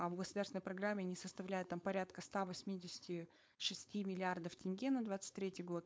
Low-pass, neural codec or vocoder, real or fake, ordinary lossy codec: none; codec, 16 kHz, 4.8 kbps, FACodec; fake; none